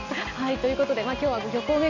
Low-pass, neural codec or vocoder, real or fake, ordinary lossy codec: 7.2 kHz; none; real; none